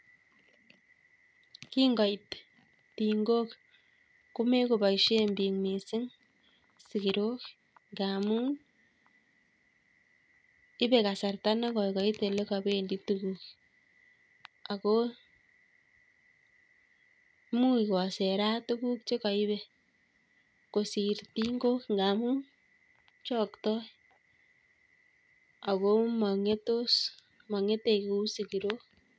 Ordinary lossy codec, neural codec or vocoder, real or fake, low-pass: none; none; real; none